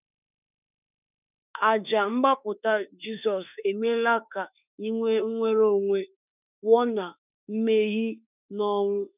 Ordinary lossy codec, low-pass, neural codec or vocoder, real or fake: none; 3.6 kHz; autoencoder, 48 kHz, 32 numbers a frame, DAC-VAE, trained on Japanese speech; fake